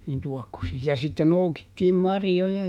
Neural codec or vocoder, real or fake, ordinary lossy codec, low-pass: autoencoder, 48 kHz, 32 numbers a frame, DAC-VAE, trained on Japanese speech; fake; none; 19.8 kHz